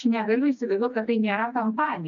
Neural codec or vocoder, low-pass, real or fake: codec, 16 kHz, 2 kbps, FreqCodec, smaller model; 7.2 kHz; fake